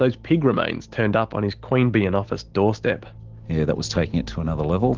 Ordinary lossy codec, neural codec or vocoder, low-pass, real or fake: Opus, 16 kbps; none; 7.2 kHz; real